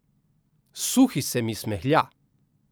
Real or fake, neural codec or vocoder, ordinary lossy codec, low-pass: real; none; none; none